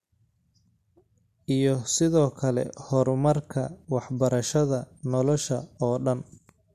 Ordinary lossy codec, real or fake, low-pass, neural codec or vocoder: MP3, 64 kbps; real; 14.4 kHz; none